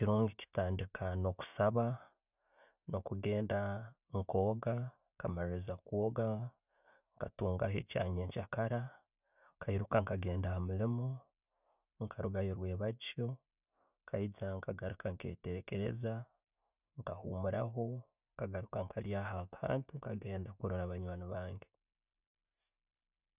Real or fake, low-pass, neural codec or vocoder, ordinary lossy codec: real; 3.6 kHz; none; none